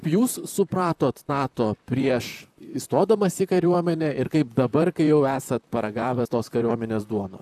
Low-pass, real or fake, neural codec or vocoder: 14.4 kHz; fake; vocoder, 44.1 kHz, 128 mel bands, Pupu-Vocoder